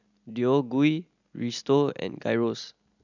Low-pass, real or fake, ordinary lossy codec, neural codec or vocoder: 7.2 kHz; real; none; none